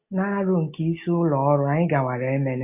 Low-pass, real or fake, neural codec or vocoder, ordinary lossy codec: 3.6 kHz; real; none; AAC, 32 kbps